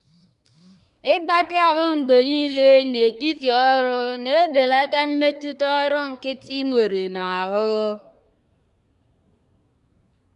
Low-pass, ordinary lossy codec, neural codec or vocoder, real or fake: 10.8 kHz; MP3, 96 kbps; codec, 24 kHz, 1 kbps, SNAC; fake